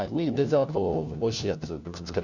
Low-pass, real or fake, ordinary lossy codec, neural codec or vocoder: 7.2 kHz; fake; none; codec, 16 kHz, 1 kbps, FunCodec, trained on LibriTTS, 50 frames a second